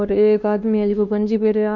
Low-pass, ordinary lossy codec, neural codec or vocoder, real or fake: 7.2 kHz; none; codec, 16 kHz, 2 kbps, X-Codec, WavLM features, trained on Multilingual LibriSpeech; fake